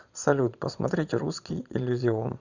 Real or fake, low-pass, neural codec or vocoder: fake; 7.2 kHz; vocoder, 22.05 kHz, 80 mel bands, Vocos